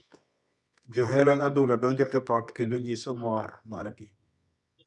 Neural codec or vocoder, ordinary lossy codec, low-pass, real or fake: codec, 24 kHz, 0.9 kbps, WavTokenizer, medium music audio release; none; 10.8 kHz; fake